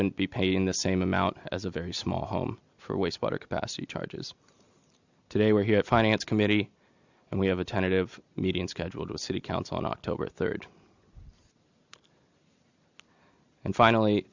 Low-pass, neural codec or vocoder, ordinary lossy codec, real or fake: 7.2 kHz; none; Opus, 64 kbps; real